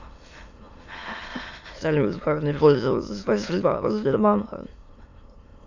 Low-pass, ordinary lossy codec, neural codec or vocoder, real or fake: 7.2 kHz; AAC, 48 kbps; autoencoder, 22.05 kHz, a latent of 192 numbers a frame, VITS, trained on many speakers; fake